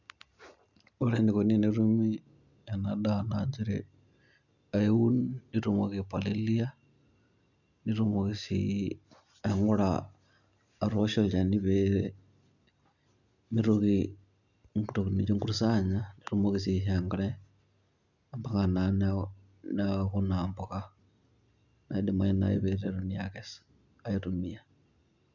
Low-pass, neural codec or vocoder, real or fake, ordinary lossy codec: 7.2 kHz; none; real; none